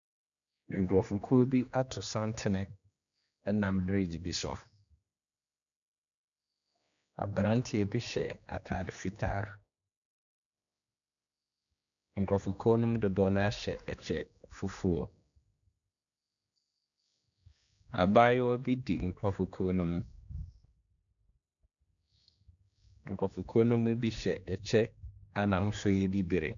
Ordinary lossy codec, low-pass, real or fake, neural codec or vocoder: MP3, 96 kbps; 7.2 kHz; fake; codec, 16 kHz, 2 kbps, X-Codec, HuBERT features, trained on general audio